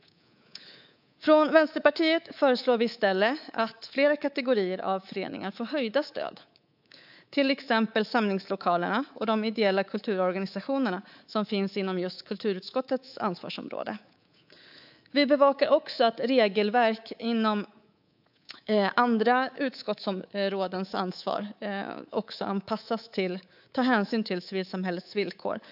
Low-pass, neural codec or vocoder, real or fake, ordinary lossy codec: 5.4 kHz; codec, 24 kHz, 3.1 kbps, DualCodec; fake; AAC, 48 kbps